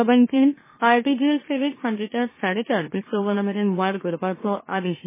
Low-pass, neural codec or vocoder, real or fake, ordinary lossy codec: 3.6 kHz; autoencoder, 44.1 kHz, a latent of 192 numbers a frame, MeloTTS; fake; MP3, 16 kbps